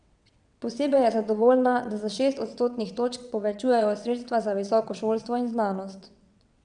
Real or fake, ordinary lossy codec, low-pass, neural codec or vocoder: fake; none; 9.9 kHz; vocoder, 22.05 kHz, 80 mel bands, WaveNeXt